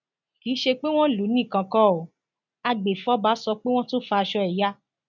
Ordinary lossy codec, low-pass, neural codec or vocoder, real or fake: none; 7.2 kHz; none; real